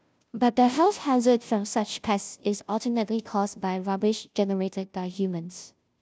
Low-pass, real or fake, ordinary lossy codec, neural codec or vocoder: none; fake; none; codec, 16 kHz, 0.5 kbps, FunCodec, trained on Chinese and English, 25 frames a second